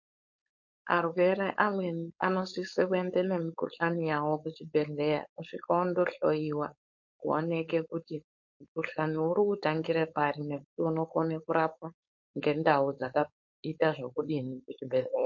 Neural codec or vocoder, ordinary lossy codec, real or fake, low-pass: codec, 16 kHz, 4.8 kbps, FACodec; MP3, 48 kbps; fake; 7.2 kHz